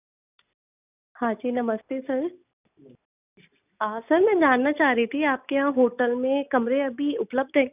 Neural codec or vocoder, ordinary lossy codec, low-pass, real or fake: none; none; 3.6 kHz; real